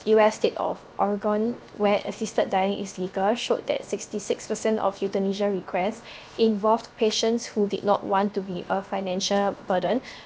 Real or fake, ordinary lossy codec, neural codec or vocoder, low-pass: fake; none; codec, 16 kHz, 0.7 kbps, FocalCodec; none